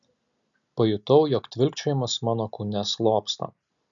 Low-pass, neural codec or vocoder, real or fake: 7.2 kHz; none; real